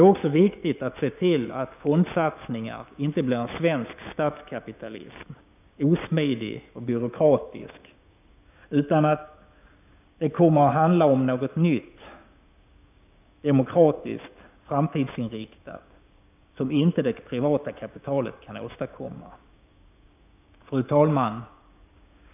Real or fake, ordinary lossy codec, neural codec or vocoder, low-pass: fake; none; codec, 16 kHz, 6 kbps, DAC; 3.6 kHz